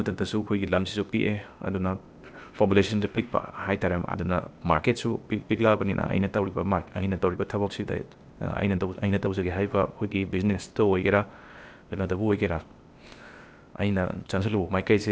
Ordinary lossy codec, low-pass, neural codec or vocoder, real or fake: none; none; codec, 16 kHz, 0.8 kbps, ZipCodec; fake